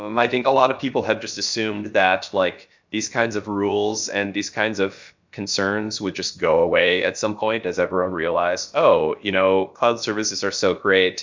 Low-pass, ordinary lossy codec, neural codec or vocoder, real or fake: 7.2 kHz; MP3, 64 kbps; codec, 16 kHz, about 1 kbps, DyCAST, with the encoder's durations; fake